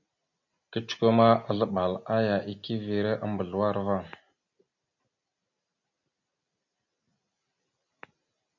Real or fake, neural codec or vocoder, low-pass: real; none; 7.2 kHz